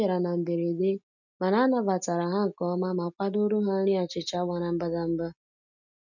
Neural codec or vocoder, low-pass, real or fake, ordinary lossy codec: none; 7.2 kHz; real; none